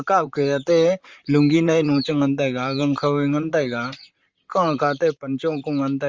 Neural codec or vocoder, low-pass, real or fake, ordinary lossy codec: vocoder, 44.1 kHz, 128 mel bands, Pupu-Vocoder; 7.2 kHz; fake; Opus, 32 kbps